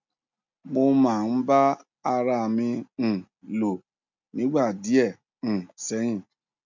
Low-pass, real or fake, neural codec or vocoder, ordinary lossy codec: 7.2 kHz; real; none; none